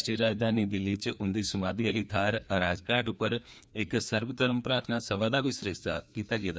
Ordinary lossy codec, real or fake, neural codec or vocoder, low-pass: none; fake; codec, 16 kHz, 2 kbps, FreqCodec, larger model; none